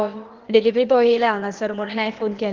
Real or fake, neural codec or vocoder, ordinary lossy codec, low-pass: fake; codec, 16 kHz, 0.8 kbps, ZipCodec; Opus, 16 kbps; 7.2 kHz